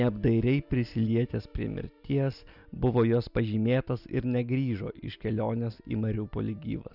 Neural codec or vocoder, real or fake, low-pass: none; real; 5.4 kHz